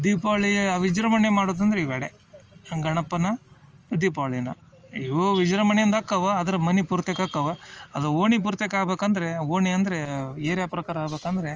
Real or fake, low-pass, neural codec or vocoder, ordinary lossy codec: real; 7.2 kHz; none; Opus, 24 kbps